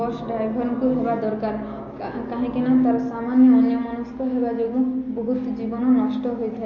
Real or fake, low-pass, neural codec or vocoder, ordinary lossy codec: real; 7.2 kHz; none; MP3, 32 kbps